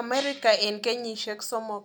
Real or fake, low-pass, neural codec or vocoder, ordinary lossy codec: real; none; none; none